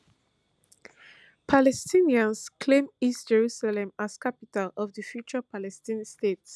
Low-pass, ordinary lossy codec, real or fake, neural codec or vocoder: none; none; real; none